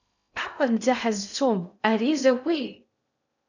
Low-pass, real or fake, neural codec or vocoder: 7.2 kHz; fake; codec, 16 kHz in and 24 kHz out, 0.6 kbps, FocalCodec, streaming, 2048 codes